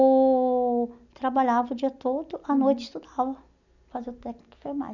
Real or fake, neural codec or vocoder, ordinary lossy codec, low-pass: real; none; none; 7.2 kHz